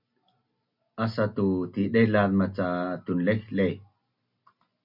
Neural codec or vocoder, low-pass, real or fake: none; 5.4 kHz; real